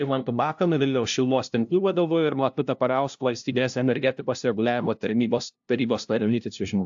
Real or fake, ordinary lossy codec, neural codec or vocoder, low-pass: fake; AAC, 64 kbps; codec, 16 kHz, 0.5 kbps, FunCodec, trained on LibriTTS, 25 frames a second; 7.2 kHz